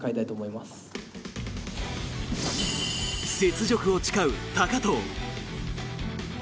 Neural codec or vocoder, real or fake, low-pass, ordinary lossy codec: none; real; none; none